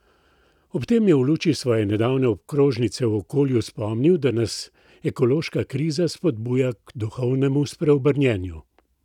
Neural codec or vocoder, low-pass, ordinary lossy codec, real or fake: none; 19.8 kHz; none; real